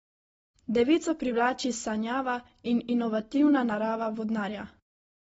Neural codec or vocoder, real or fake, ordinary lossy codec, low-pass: none; real; AAC, 24 kbps; 19.8 kHz